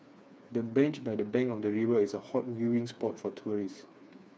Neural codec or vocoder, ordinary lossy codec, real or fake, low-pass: codec, 16 kHz, 4 kbps, FreqCodec, smaller model; none; fake; none